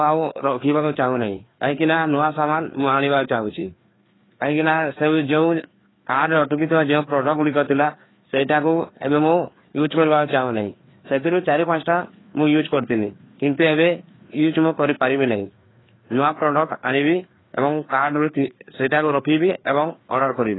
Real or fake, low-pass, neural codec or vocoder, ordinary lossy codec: fake; 7.2 kHz; codec, 16 kHz, 2 kbps, FreqCodec, larger model; AAC, 16 kbps